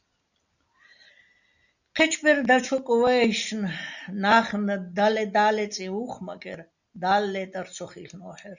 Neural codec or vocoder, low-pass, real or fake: none; 7.2 kHz; real